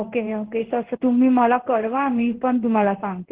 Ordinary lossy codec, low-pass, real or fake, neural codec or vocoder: Opus, 16 kbps; 3.6 kHz; fake; codec, 16 kHz in and 24 kHz out, 1 kbps, XY-Tokenizer